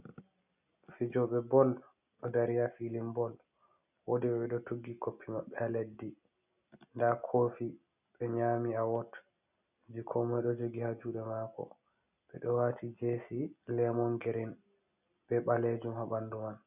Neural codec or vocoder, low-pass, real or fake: none; 3.6 kHz; real